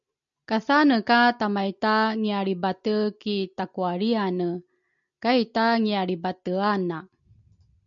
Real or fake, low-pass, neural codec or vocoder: real; 7.2 kHz; none